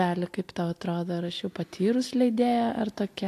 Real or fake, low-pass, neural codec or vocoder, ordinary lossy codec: real; 14.4 kHz; none; AAC, 64 kbps